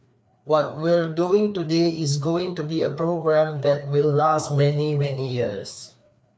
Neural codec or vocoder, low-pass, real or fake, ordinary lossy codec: codec, 16 kHz, 2 kbps, FreqCodec, larger model; none; fake; none